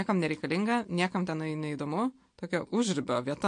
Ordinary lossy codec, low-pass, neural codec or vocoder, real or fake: MP3, 48 kbps; 9.9 kHz; none; real